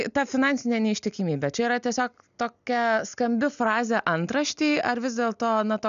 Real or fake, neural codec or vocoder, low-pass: real; none; 7.2 kHz